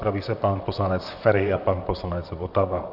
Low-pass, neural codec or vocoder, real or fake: 5.4 kHz; vocoder, 44.1 kHz, 128 mel bands, Pupu-Vocoder; fake